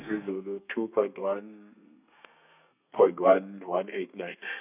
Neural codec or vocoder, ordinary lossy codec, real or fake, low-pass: codec, 32 kHz, 1.9 kbps, SNAC; none; fake; 3.6 kHz